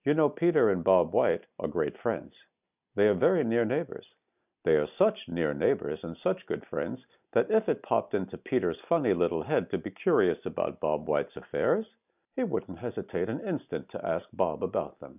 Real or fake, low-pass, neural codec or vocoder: real; 3.6 kHz; none